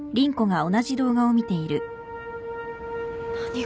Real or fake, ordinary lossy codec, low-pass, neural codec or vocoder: real; none; none; none